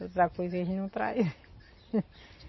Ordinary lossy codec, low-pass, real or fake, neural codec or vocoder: MP3, 24 kbps; 7.2 kHz; real; none